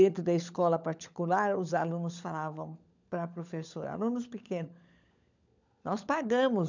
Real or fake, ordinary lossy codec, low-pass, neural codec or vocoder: fake; none; 7.2 kHz; codec, 16 kHz, 16 kbps, FunCodec, trained on LibriTTS, 50 frames a second